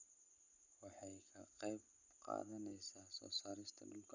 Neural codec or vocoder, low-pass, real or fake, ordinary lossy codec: none; 7.2 kHz; real; none